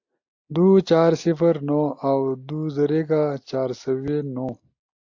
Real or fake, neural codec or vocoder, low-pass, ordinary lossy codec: real; none; 7.2 kHz; AAC, 48 kbps